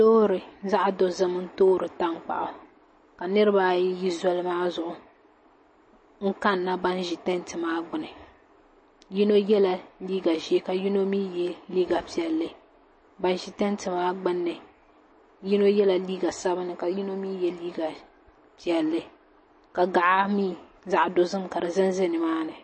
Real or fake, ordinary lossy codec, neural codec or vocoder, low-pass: real; MP3, 32 kbps; none; 9.9 kHz